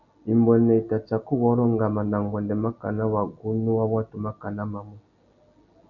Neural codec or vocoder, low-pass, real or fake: none; 7.2 kHz; real